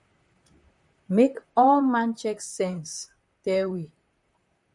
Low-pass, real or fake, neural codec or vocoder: 10.8 kHz; fake; vocoder, 44.1 kHz, 128 mel bands, Pupu-Vocoder